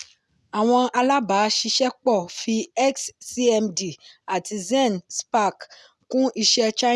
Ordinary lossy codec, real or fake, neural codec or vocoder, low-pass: none; real; none; none